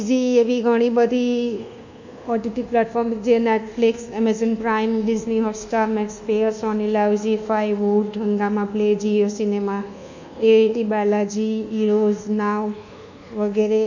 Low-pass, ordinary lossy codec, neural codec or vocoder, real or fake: 7.2 kHz; none; codec, 24 kHz, 1.2 kbps, DualCodec; fake